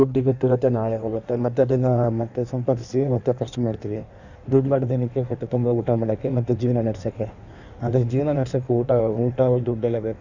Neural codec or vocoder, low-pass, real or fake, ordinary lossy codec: codec, 16 kHz in and 24 kHz out, 1.1 kbps, FireRedTTS-2 codec; 7.2 kHz; fake; none